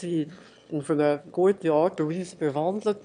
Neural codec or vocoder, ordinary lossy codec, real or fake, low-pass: autoencoder, 22.05 kHz, a latent of 192 numbers a frame, VITS, trained on one speaker; none; fake; 9.9 kHz